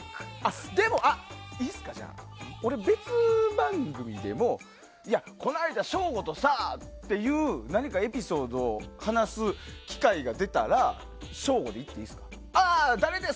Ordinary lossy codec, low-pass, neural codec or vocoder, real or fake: none; none; none; real